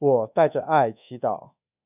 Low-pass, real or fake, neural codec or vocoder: 3.6 kHz; fake; codec, 24 kHz, 1.2 kbps, DualCodec